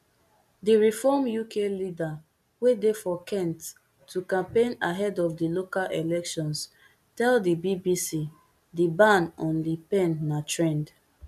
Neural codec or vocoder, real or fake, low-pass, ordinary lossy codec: none; real; 14.4 kHz; none